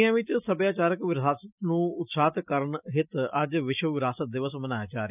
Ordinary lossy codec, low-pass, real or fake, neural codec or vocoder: none; 3.6 kHz; real; none